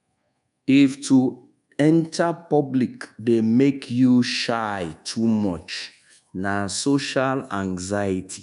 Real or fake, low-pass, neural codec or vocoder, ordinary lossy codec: fake; 10.8 kHz; codec, 24 kHz, 1.2 kbps, DualCodec; none